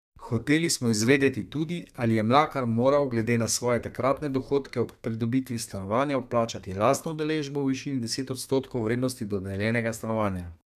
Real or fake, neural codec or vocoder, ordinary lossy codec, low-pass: fake; codec, 32 kHz, 1.9 kbps, SNAC; none; 14.4 kHz